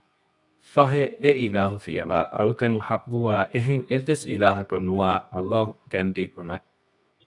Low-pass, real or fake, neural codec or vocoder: 10.8 kHz; fake; codec, 24 kHz, 0.9 kbps, WavTokenizer, medium music audio release